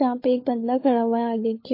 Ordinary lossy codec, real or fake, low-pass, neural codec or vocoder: MP3, 24 kbps; fake; 5.4 kHz; codec, 16 kHz, 16 kbps, FunCodec, trained on LibriTTS, 50 frames a second